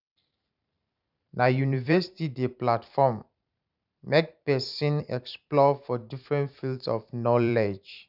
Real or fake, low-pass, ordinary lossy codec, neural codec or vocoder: fake; 5.4 kHz; none; vocoder, 44.1 kHz, 128 mel bands every 512 samples, BigVGAN v2